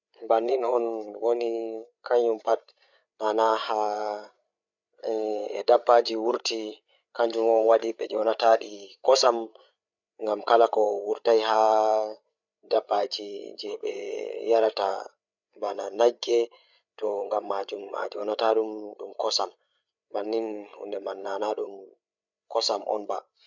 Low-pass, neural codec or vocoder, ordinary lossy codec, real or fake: 7.2 kHz; codec, 16 kHz, 8 kbps, FreqCodec, larger model; none; fake